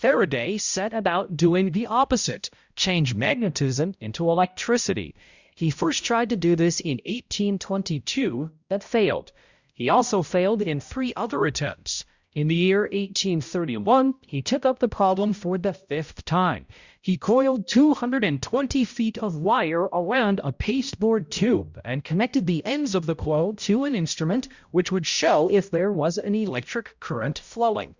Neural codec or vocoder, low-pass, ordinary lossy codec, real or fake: codec, 16 kHz, 0.5 kbps, X-Codec, HuBERT features, trained on balanced general audio; 7.2 kHz; Opus, 64 kbps; fake